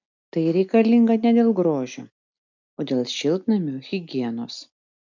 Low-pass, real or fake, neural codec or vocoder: 7.2 kHz; real; none